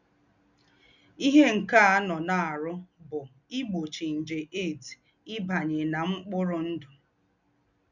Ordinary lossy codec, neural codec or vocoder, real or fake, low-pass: none; none; real; 7.2 kHz